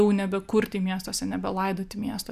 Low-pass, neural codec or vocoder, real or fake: 14.4 kHz; none; real